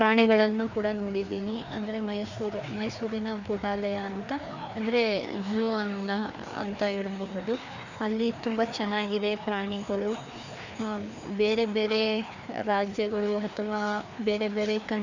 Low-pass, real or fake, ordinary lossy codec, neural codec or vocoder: 7.2 kHz; fake; none; codec, 16 kHz, 2 kbps, FreqCodec, larger model